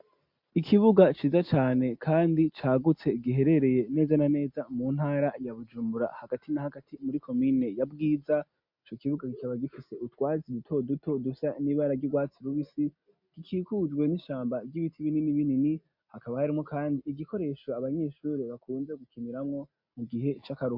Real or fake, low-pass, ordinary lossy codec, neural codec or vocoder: real; 5.4 kHz; MP3, 48 kbps; none